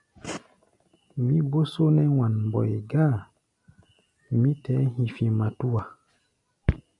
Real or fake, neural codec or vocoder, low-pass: real; none; 10.8 kHz